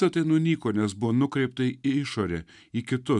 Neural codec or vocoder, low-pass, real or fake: none; 10.8 kHz; real